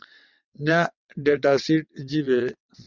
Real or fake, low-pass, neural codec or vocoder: fake; 7.2 kHz; vocoder, 22.05 kHz, 80 mel bands, WaveNeXt